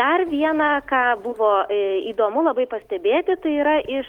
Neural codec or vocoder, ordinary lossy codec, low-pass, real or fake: none; Opus, 24 kbps; 19.8 kHz; real